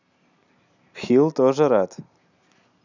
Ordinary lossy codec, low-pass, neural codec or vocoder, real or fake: none; 7.2 kHz; none; real